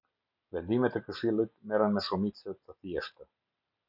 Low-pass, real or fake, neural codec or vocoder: 5.4 kHz; real; none